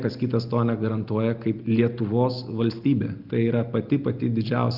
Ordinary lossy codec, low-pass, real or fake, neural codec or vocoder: Opus, 24 kbps; 5.4 kHz; real; none